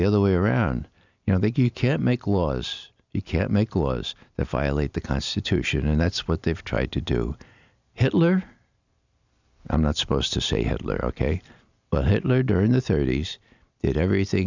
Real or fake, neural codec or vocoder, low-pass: real; none; 7.2 kHz